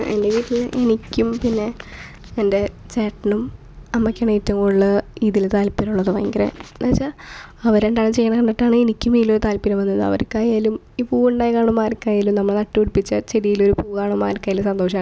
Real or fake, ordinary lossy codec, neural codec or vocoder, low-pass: real; none; none; none